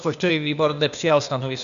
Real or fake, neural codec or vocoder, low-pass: fake; codec, 16 kHz, 0.8 kbps, ZipCodec; 7.2 kHz